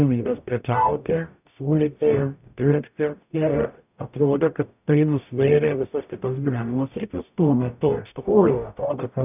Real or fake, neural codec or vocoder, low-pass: fake; codec, 44.1 kHz, 0.9 kbps, DAC; 3.6 kHz